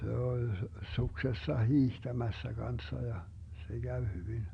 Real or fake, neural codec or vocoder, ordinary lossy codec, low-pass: real; none; none; 9.9 kHz